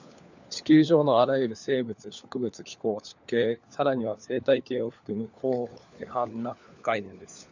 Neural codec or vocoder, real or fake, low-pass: codec, 16 kHz, 4 kbps, FunCodec, trained on LibriTTS, 50 frames a second; fake; 7.2 kHz